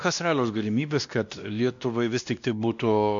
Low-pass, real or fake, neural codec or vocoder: 7.2 kHz; fake; codec, 16 kHz, 1 kbps, X-Codec, WavLM features, trained on Multilingual LibriSpeech